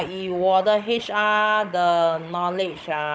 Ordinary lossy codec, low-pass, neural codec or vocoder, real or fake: none; none; codec, 16 kHz, 16 kbps, FunCodec, trained on Chinese and English, 50 frames a second; fake